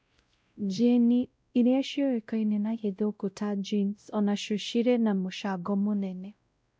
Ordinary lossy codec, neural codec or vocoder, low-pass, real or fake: none; codec, 16 kHz, 0.5 kbps, X-Codec, WavLM features, trained on Multilingual LibriSpeech; none; fake